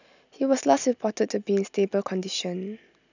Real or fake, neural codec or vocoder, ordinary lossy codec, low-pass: real; none; none; 7.2 kHz